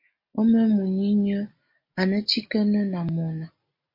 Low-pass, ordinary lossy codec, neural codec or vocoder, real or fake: 5.4 kHz; MP3, 32 kbps; none; real